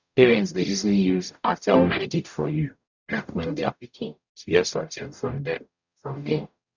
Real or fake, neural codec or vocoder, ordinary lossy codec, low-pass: fake; codec, 44.1 kHz, 0.9 kbps, DAC; none; 7.2 kHz